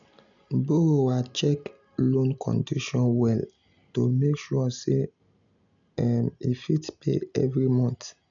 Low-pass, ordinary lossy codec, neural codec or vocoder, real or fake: 7.2 kHz; none; none; real